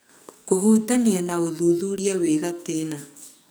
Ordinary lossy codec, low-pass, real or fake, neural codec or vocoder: none; none; fake; codec, 44.1 kHz, 2.6 kbps, SNAC